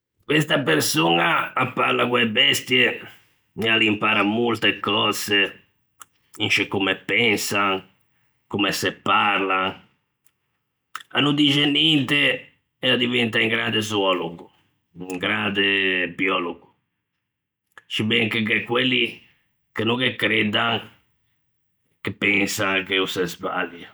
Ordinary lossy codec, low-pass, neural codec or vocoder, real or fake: none; none; none; real